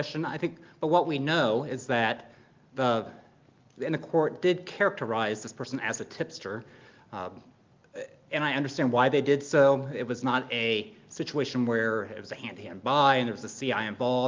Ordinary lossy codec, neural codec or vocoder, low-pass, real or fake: Opus, 32 kbps; none; 7.2 kHz; real